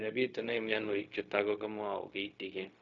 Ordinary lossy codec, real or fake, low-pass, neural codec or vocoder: none; fake; 7.2 kHz; codec, 16 kHz, 0.4 kbps, LongCat-Audio-Codec